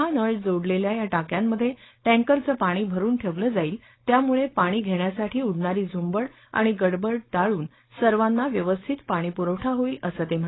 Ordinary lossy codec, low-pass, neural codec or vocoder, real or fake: AAC, 16 kbps; 7.2 kHz; codec, 16 kHz, 4.8 kbps, FACodec; fake